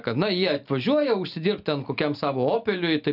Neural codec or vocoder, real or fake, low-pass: none; real; 5.4 kHz